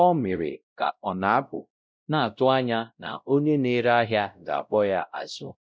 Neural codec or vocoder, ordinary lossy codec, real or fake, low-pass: codec, 16 kHz, 0.5 kbps, X-Codec, WavLM features, trained on Multilingual LibriSpeech; none; fake; none